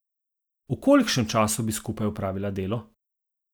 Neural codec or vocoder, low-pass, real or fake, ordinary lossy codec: none; none; real; none